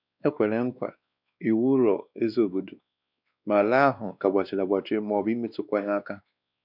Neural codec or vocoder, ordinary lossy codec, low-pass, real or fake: codec, 16 kHz, 2 kbps, X-Codec, WavLM features, trained on Multilingual LibriSpeech; none; 5.4 kHz; fake